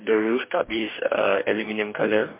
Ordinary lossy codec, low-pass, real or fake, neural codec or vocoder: MP3, 32 kbps; 3.6 kHz; fake; codec, 44.1 kHz, 2.6 kbps, DAC